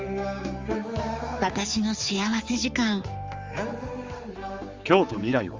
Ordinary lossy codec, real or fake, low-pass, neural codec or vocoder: Opus, 32 kbps; fake; 7.2 kHz; codec, 16 kHz, 4 kbps, X-Codec, HuBERT features, trained on general audio